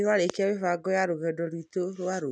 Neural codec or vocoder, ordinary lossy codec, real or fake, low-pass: none; none; real; 9.9 kHz